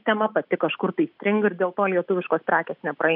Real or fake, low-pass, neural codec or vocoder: real; 5.4 kHz; none